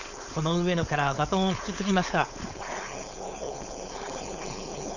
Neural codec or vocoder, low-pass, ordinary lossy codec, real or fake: codec, 16 kHz, 4.8 kbps, FACodec; 7.2 kHz; none; fake